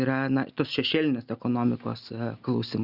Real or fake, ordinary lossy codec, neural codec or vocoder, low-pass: real; Opus, 64 kbps; none; 5.4 kHz